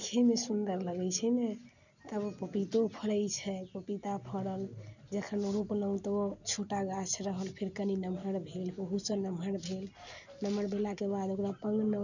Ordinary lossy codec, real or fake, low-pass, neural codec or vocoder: none; real; 7.2 kHz; none